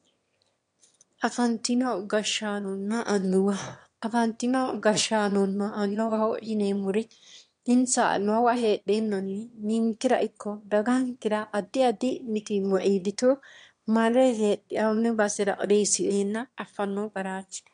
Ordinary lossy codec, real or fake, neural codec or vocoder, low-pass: MP3, 64 kbps; fake; autoencoder, 22.05 kHz, a latent of 192 numbers a frame, VITS, trained on one speaker; 9.9 kHz